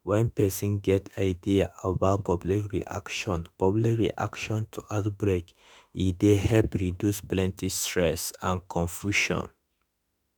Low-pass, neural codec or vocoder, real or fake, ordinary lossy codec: none; autoencoder, 48 kHz, 32 numbers a frame, DAC-VAE, trained on Japanese speech; fake; none